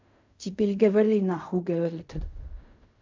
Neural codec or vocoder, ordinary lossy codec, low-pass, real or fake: codec, 16 kHz in and 24 kHz out, 0.4 kbps, LongCat-Audio-Codec, fine tuned four codebook decoder; MP3, 64 kbps; 7.2 kHz; fake